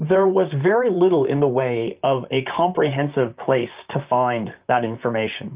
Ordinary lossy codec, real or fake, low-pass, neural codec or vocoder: Opus, 24 kbps; fake; 3.6 kHz; vocoder, 44.1 kHz, 128 mel bands, Pupu-Vocoder